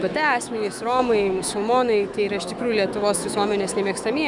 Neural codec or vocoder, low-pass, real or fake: autoencoder, 48 kHz, 128 numbers a frame, DAC-VAE, trained on Japanese speech; 10.8 kHz; fake